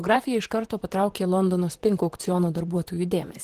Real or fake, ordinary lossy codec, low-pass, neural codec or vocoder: fake; Opus, 16 kbps; 14.4 kHz; vocoder, 44.1 kHz, 128 mel bands, Pupu-Vocoder